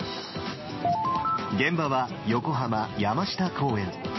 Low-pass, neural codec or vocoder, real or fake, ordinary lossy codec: 7.2 kHz; none; real; MP3, 24 kbps